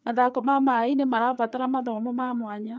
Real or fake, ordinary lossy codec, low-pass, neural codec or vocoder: fake; none; none; codec, 16 kHz, 4 kbps, FreqCodec, larger model